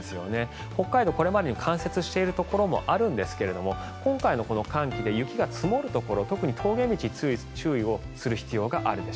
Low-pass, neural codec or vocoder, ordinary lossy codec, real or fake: none; none; none; real